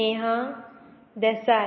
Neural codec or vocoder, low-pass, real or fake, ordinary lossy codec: none; 7.2 kHz; real; MP3, 24 kbps